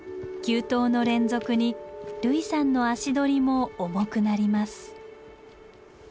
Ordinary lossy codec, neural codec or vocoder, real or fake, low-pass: none; none; real; none